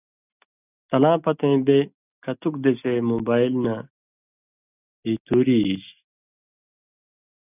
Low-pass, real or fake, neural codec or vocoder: 3.6 kHz; real; none